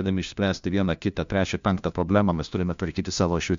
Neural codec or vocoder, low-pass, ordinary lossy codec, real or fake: codec, 16 kHz, 1 kbps, FunCodec, trained on LibriTTS, 50 frames a second; 7.2 kHz; MP3, 64 kbps; fake